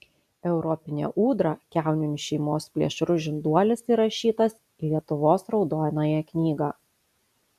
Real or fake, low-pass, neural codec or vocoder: real; 14.4 kHz; none